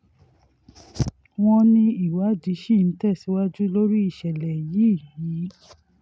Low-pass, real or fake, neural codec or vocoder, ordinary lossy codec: none; real; none; none